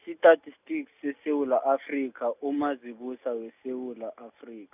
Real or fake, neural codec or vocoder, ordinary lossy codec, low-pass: real; none; none; 3.6 kHz